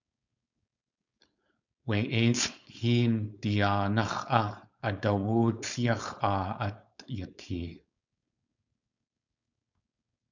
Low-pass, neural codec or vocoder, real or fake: 7.2 kHz; codec, 16 kHz, 4.8 kbps, FACodec; fake